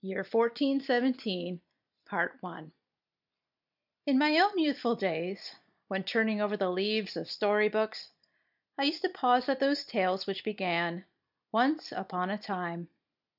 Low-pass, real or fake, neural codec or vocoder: 5.4 kHz; real; none